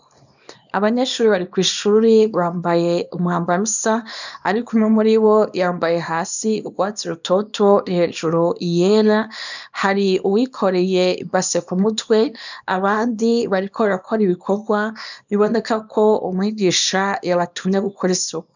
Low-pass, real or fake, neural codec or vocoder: 7.2 kHz; fake; codec, 24 kHz, 0.9 kbps, WavTokenizer, small release